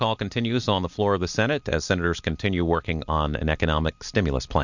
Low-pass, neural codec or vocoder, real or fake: 7.2 kHz; none; real